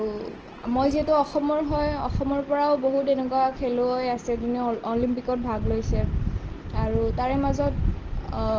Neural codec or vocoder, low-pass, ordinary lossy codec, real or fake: none; 7.2 kHz; Opus, 16 kbps; real